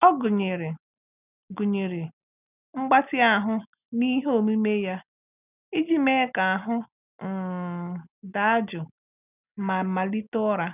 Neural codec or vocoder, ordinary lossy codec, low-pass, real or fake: none; none; 3.6 kHz; real